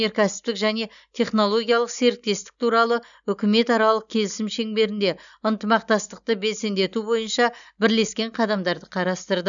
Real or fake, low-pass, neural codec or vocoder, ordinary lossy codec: real; 7.2 kHz; none; none